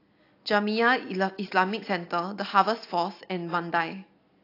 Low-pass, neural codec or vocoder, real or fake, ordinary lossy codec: 5.4 kHz; none; real; AAC, 32 kbps